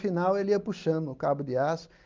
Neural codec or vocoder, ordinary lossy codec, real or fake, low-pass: none; Opus, 32 kbps; real; 7.2 kHz